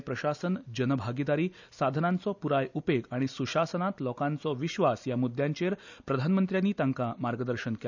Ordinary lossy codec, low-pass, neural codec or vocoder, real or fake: none; 7.2 kHz; none; real